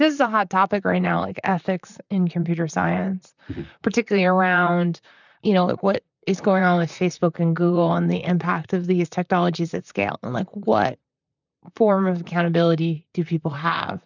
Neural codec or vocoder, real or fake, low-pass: vocoder, 44.1 kHz, 128 mel bands, Pupu-Vocoder; fake; 7.2 kHz